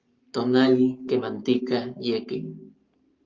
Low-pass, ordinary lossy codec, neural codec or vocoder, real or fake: 7.2 kHz; Opus, 32 kbps; codec, 44.1 kHz, 7.8 kbps, Pupu-Codec; fake